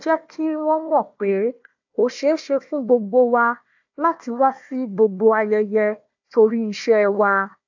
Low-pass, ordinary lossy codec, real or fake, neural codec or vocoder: 7.2 kHz; AAC, 48 kbps; fake; codec, 16 kHz, 1 kbps, FunCodec, trained on Chinese and English, 50 frames a second